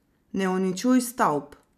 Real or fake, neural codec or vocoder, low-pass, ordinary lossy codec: real; none; 14.4 kHz; none